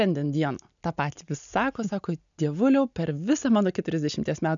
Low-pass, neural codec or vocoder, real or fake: 7.2 kHz; none; real